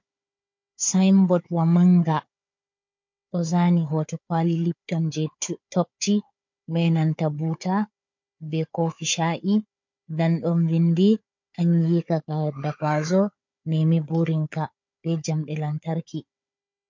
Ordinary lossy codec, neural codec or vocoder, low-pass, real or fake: MP3, 48 kbps; codec, 16 kHz, 4 kbps, FunCodec, trained on Chinese and English, 50 frames a second; 7.2 kHz; fake